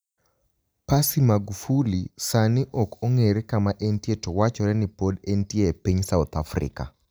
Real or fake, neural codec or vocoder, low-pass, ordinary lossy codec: real; none; none; none